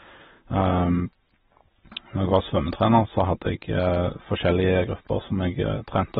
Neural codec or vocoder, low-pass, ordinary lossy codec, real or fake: none; 19.8 kHz; AAC, 16 kbps; real